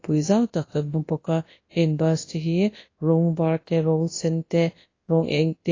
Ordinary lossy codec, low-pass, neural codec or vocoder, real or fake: AAC, 32 kbps; 7.2 kHz; codec, 24 kHz, 0.9 kbps, WavTokenizer, large speech release; fake